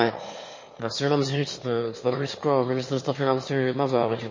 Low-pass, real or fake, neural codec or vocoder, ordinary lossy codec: 7.2 kHz; fake; autoencoder, 22.05 kHz, a latent of 192 numbers a frame, VITS, trained on one speaker; MP3, 32 kbps